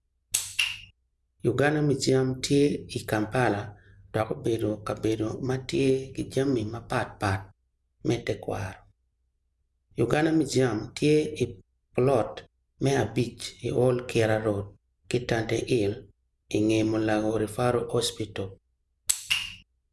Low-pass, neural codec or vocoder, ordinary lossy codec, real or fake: none; none; none; real